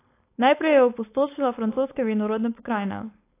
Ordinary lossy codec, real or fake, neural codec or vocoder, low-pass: AAC, 24 kbps; fake; codec, 16 kHz, 4.8 kbps, FACodec; 3.6 kHz